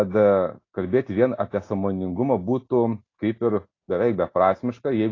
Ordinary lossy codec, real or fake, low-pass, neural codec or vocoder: AAC, 32 kbps; real; 7.2 kHz; none